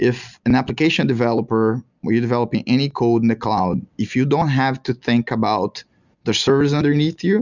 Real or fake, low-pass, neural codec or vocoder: real; 7.2 kHz; none